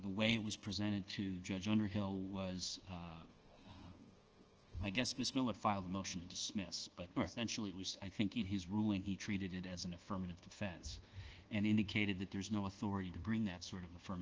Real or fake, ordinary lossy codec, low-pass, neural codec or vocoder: fake; Opus, 16 kbps; 7.2 kHz; codec, 24 kHz, 1.2 kbps, DualCodec